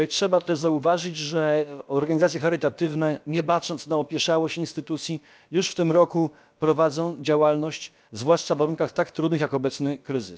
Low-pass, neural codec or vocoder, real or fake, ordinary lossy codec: none; codec, 16 kHz, about 1 kbps, DyCAST, with the encoder's durations; fake; none